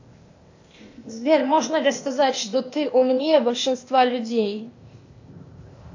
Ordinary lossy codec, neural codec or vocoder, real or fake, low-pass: AAC, 48 kbps; codec, 16 kHz, 0.8 kbps, ZipCodec; fake; 7.2 kHz